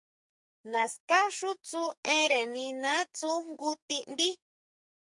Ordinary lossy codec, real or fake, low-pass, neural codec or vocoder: MP3, 64 kbps; fake; 10.8 kHz; codec, 44.1 kHz, 2.6 kbps, SNAC